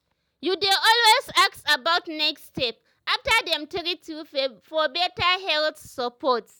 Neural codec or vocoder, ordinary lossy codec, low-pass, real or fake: none; none; none; real